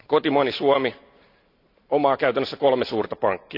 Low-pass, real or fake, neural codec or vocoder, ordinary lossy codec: 5.4 kHz; real; none; none